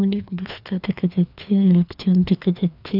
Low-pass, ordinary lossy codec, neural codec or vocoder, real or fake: 5.4 kHz; none; codec, 16 kHz, 2 kbps, FreqCodec, larger model; fake